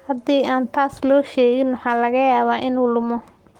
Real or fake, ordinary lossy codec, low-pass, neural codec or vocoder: fake; Opus, 24 kbps; 19.8 kHz; codec, 44.1 kHz, 7.8 kbps, Pupu-Codec